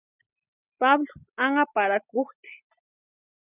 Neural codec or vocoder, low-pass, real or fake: none; 3.6 kHz; real